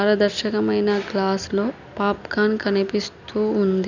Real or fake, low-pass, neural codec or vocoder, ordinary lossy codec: real; 7.2 kHz; none; none